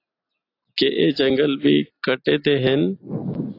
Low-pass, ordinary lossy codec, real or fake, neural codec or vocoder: 5.4 kHz; AAC, 32 kbps; real; none